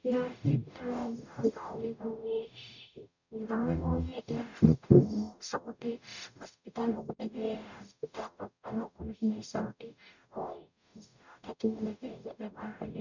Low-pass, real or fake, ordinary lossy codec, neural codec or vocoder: 7.2 kHz; fake; none; codec, 44.1 kHz, 0.9 kbps, DAC